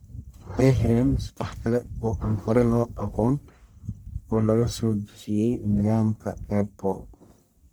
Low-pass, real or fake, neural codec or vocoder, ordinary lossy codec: none; fake; codec, 44.1 kHz, 1.7 kbps, Pupu-Codec; none